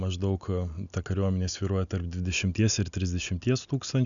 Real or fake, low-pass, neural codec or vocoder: real; 7.2 kHz; none